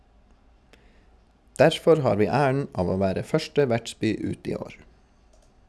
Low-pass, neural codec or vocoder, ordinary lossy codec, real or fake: none; none; none; real